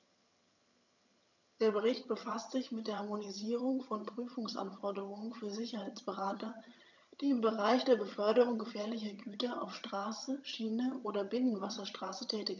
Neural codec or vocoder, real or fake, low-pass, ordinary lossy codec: vocoder, 22.05 kHz, 80 mel bands, HiFi-GAN; fake; 7.2 kHz; none